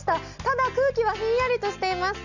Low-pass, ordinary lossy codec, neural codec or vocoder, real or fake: 7.2 kHz; none; none; real